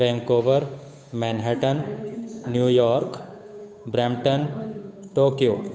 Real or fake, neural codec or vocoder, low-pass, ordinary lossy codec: fake; codec, 16 kHz, 8 kbps, FunCodec, trained on Chinese and English, 25 frames a second; none; none